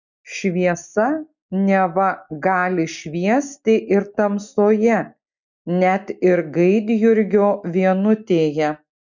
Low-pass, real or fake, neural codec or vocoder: 7.2 kHz; real; none